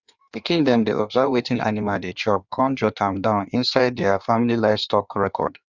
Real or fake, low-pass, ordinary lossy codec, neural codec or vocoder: fake; 7.2 kHz; Opus, 64 kbps; codec, 16 kHz in and 24 kHz out, 1.1 kbps, FireRedTTS-2 codec